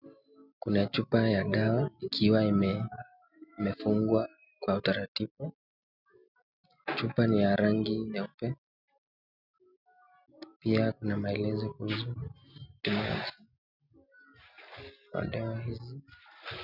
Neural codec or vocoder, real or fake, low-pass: none; real; 5.4 kHz